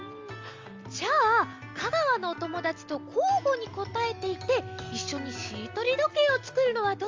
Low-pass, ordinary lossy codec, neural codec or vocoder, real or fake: 7.2 kHz; Opus, 32 kbps; none; real